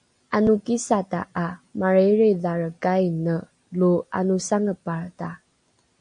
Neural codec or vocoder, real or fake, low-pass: none; real; 9.9 kHz